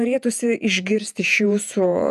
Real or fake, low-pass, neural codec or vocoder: fake; 14.4 kHz; vocoder, 48 kHz, 128 mel bands, Vocos